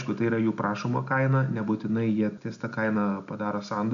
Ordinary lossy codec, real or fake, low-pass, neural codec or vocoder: MP3, 96 kbps; real; 7.2 kHz; none